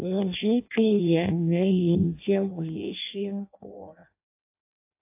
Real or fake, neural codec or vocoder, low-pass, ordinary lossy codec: fake; codec, 16 kHz in and 24 kHz out, 0.6 kbps, FireRedTTS-2 codec; 3.6 kHz; AAC, 24 kbps